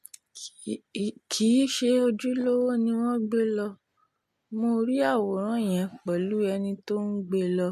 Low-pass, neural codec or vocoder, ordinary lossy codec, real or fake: 14.4 kHz; none; MP3, 64 kbps; real